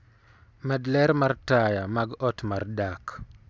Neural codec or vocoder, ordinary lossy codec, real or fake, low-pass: none; none; real; none